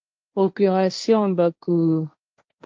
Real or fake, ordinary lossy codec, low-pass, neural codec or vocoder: fake; Opus, 24 kbps; 7.2 kHz; codec, 16 kHz, 1.1 kbps, Voila-Tokenizer